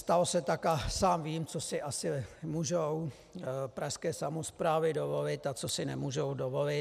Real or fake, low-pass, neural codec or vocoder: real; 14.4 kHz; none